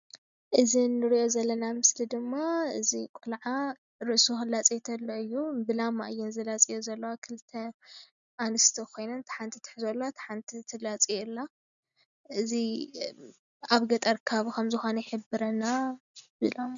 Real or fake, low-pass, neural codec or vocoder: real; 7.2 kHz; none